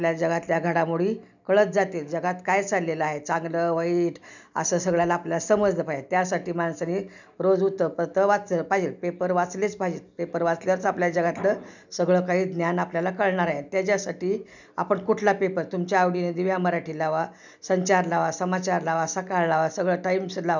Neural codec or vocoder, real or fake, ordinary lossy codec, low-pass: none; real; none; 7.2 kHz